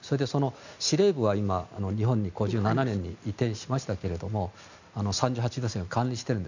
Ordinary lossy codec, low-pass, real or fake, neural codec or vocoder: none; 7.2 kHz; real; none